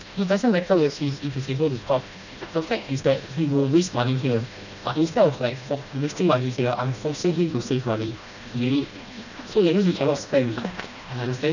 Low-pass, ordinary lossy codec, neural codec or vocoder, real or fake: 7.2 kHz; none; codec, 16 kHz, 1 kbps, FreqCodec, smaller model; fake